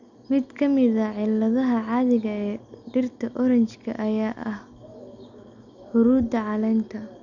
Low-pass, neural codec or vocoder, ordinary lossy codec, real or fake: 7.2 kHz; none; none; real